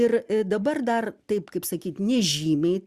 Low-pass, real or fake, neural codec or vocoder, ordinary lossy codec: 14.4 kHz; real; none; Opus, 64 kbps